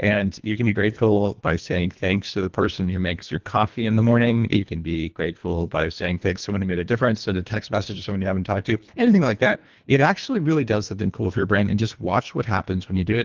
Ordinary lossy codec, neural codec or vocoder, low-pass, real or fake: Opus, 32 kbps; codec, 24 kHz, 1.5 kbps, HILCodec; 7.2 kHz; fake